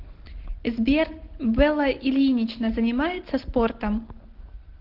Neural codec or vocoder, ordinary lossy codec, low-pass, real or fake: none; Opus, 16 kbps; 5.4 kHz; real